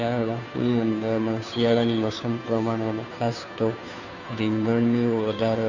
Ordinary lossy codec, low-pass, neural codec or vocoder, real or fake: none; 7.2 kHz; codec, 16 kHz, 2 kbps, FunCodec, trained on Chinese and English, 25 frames a second; fake